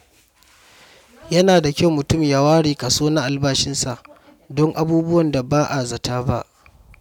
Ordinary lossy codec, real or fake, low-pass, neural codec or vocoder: none; real; 19.8 kHz; none